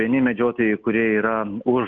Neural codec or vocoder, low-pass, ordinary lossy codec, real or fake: none; 7.2 kHz; Opus, 24 kbps; real